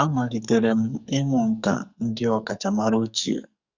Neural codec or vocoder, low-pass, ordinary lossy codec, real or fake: codec, 44.1 kHz, 2.6 kbps, SNAC; 7.2 kHz; Opus, 64 kbps; fake